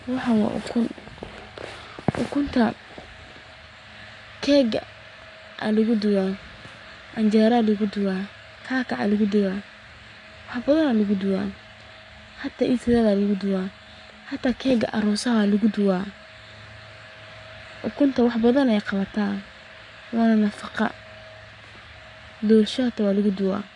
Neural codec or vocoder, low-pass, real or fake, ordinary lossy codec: codec, 44.1 kHz, 7.8 kbps, Pupu-Codec; 10.8 kHz; fake; none